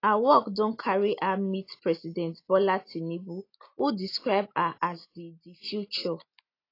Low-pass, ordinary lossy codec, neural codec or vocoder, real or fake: 5.4 kHz; AAC, 32 kbps; none; real